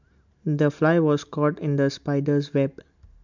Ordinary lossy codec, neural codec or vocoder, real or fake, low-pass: MP3, 64 kbps; none; real; 7.2 kHz